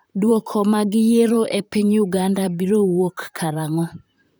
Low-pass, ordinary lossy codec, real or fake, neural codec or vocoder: none; none; fake; vocoder, 44.1 kHz, 128 mel bands, Pupu-Vocoder